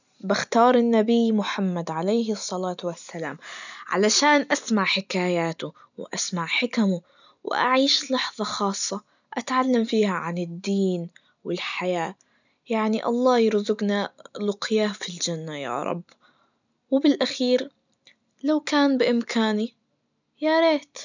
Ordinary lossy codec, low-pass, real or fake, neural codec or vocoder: none; 7.2 kHz; real; none